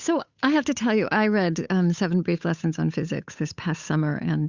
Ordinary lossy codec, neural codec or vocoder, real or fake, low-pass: Opus, 64 kbps; codec, 16 kHz, 8 kbps, FunCodec, trained on Chinese and English, 25 frames a second; fake; 7.2 kHz